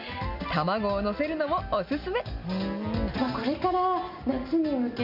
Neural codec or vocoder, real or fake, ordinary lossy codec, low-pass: none; real; none; 5.4 kHz